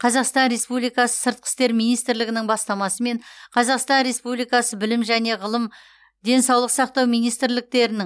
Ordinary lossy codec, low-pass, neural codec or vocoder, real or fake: none; none; none; real